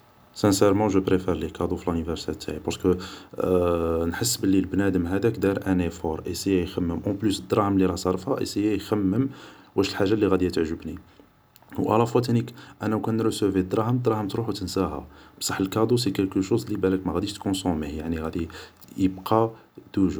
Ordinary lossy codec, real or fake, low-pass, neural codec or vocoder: none; real; none; none